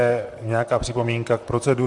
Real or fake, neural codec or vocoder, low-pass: fake; vocoder, 44.1 kHz, 128 mel bands, Pupu-Vocoder; 10.8 kHz